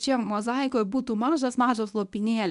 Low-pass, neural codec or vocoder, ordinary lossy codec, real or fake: 10.8 kHz; codec, 24 kHz, 0.9 kbps, WavTokenizer, medium speech release version 1; MP3, 96 kbps; fake